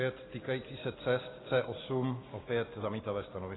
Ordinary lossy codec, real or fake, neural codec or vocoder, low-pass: AAC, 16 kbps; real; none; 7.2 kHz